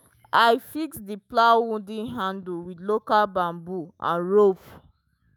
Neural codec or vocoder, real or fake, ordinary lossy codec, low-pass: autoencoder, 48 kHz, 128 numbers a frame, DAC-VAE, trained on Japanese speech; fake; none; none